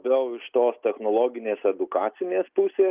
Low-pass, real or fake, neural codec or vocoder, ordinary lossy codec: 3.6 kHz; real; none; Opus, 24 kbps